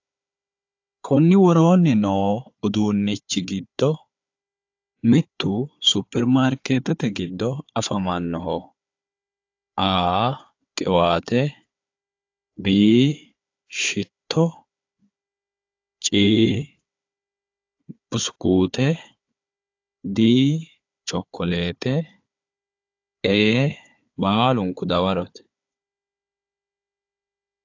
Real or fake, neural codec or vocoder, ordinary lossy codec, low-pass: fake; codec, 16 kHz, 4 kbps, FunCodec, trained on Chinese and English, 50 frames a second; AAC, 48 kbps; 7.2 kHz